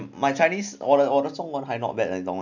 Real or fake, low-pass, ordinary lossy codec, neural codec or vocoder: real; 7.2 kHz; none; none